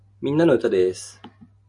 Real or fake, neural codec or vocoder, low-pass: real; none; 10.8 kHz